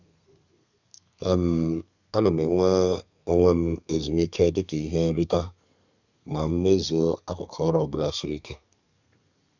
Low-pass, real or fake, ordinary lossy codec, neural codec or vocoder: 7.2 kHz; fake; none; codec, 32 kHz, 1.9 kbps, SNAC